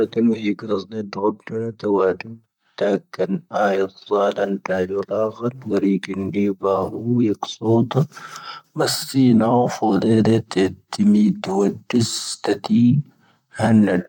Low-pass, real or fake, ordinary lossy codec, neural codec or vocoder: 19.8 kHz; fake; none; vocoder, 44.1 kHz, 128 mel bands, Pupu-Vocoder